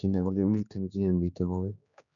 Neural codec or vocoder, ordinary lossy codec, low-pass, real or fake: codec, 16 kHz, 2 kbps, X-Codec, HuBERT features, trained on general audio; none; 7.2 kHz; fake